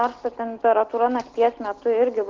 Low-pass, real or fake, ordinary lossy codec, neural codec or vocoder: 7.2 kHz; real; Opus, 16 kbps; none